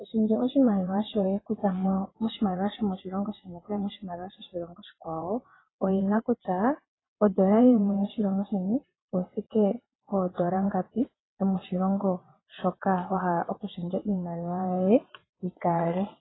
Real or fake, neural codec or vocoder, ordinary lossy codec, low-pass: fake; vocoder, 22.05 kHz, 80 mel bands, WaveNeXt; AAC, 16 kbps; 7.2 kHz